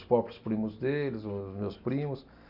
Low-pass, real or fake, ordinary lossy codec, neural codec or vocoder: 5.4 kHz; real; none; none